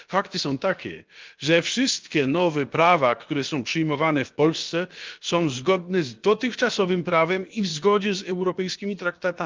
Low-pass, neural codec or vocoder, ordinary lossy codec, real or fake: 7.2 kHz; codec, 16 kHz, about 1 kbps, DyCAST, with the encoder's durations; Opus, 24 kbps; fake